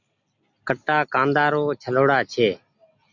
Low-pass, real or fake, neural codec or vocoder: 7.2 kHz; real; none